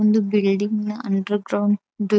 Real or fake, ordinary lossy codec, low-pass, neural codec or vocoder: real; none; none; none